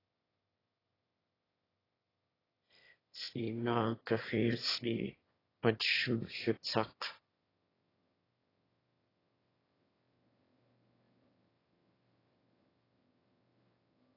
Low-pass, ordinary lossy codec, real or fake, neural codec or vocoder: 5.4 kHz; AAC, 24 kbps; fake; autoencoder, 22.05 kHz, a latent of 192 numbers a frame, VITS, trained on one speaker